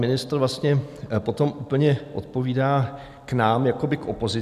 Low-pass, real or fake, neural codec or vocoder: 14.4 kHz; real; none